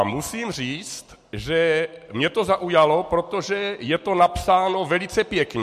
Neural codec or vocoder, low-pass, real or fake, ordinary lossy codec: none; 14.4 kHz; real; MP3, 64 kbps